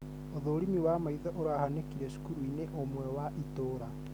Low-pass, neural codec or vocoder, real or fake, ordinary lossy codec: none; none; real; none